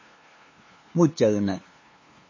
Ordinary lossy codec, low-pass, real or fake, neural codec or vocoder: MP3, 32 kbps; 7.2 kHz; fake; codec, 16 kHz, 2 kbps, FunCodec, trained on LibriTTS, 25 frames a second